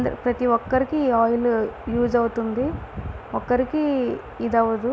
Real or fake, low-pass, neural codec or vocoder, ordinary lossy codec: real; none; none; none